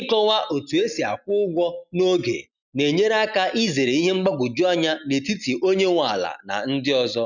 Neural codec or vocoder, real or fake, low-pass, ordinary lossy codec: none; real; 7.2 kHz; none